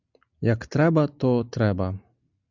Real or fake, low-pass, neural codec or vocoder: real; 7.2 kHz; none